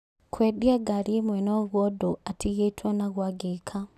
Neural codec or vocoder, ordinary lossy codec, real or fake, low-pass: none; none; real; 14.4 kHz